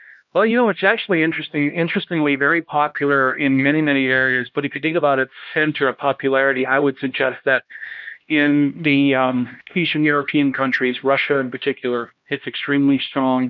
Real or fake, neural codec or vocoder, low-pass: fake; codec, 16 kHz, 1 kbps, X-Codec, HuBERT features, trained on LibriSpeech; 7.2 kHz